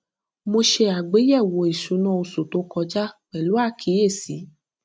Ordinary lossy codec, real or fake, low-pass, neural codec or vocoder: none; real; none; none